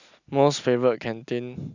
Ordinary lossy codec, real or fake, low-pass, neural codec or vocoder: none; real; 7.2 kHz; none